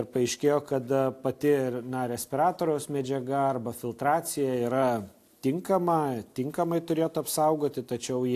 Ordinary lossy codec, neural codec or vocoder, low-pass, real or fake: AAC, 64 kbps; none; 14.4 kHz; real